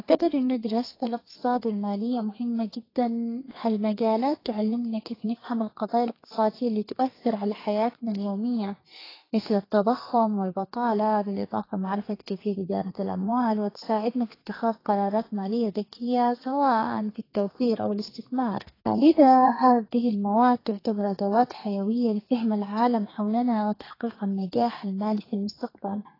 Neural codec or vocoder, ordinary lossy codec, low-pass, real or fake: codec, 32 kHz, 1.9 kbps, SNAC; AAC, 24 kbps; 5.4 kHz; fake